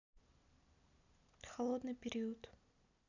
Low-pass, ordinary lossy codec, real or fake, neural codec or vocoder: 7.2 kHz; none; real; none